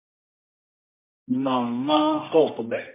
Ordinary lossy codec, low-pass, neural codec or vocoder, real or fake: MP3, 24 kbps; 3.6 kHz; codec, 24 kHz, 0.9 kbps, WavTokenizer, medium music audio release; fake